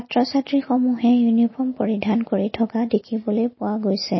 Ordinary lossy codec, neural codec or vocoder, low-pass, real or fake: MP3, 24 kbps; none; 7.2 kHz; real